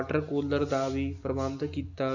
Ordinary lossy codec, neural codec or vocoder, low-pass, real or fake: none; none; 7.2 kHz; real